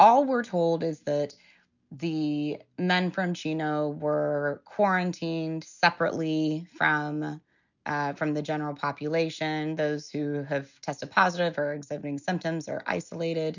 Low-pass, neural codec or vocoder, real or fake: 7.2 kHz; none; real